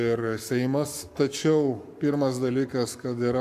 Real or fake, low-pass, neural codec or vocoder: fake; 14.4 kHz; codec, 44.1 kHz, 7.8 kbps, Pupu-Codec